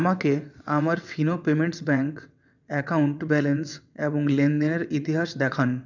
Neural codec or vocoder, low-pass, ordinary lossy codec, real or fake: vocoder, 22.05 kHz, 80 mel bands, WaveNeXt; 7.2 kHz; none; fake